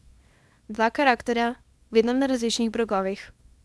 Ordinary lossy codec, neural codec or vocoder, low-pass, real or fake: none; codec, 24 kHz, 0.9 kbps, WavTokenizer, small release; none; fake